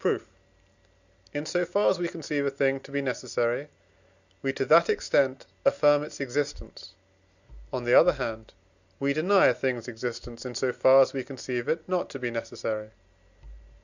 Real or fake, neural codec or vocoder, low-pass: real; none; 7.2 kHz